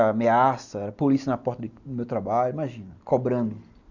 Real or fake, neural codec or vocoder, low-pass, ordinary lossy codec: real; none; 7.2 kHz; none